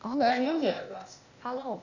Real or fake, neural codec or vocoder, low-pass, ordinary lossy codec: fake; codec, 16 kHz, 0.8 kbps, ZipCodec; 7.2 kHz; none